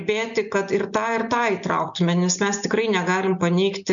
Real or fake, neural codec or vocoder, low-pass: real; none; 7.2 kHz